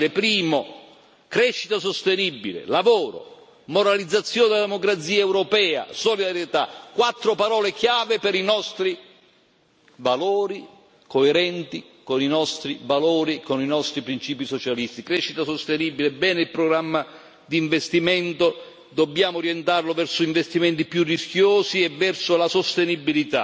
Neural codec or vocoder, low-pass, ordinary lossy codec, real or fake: none; none; none; real